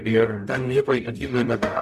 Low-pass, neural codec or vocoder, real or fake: 14.4 kHz; codec, 44.1 kHz, 0.9 kbps, DAC; fake